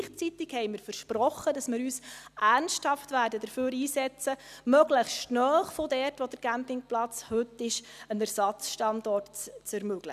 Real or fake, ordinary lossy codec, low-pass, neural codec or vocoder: real; none; 14.4 kHz; none